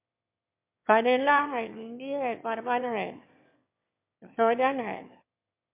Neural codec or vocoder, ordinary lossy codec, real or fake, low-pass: autoencoder, 22.05 kHz, a latent of 192 numbers a frame, VITS, trained on one speaker; MP3, 32 kbps; fake; 3.6 kHz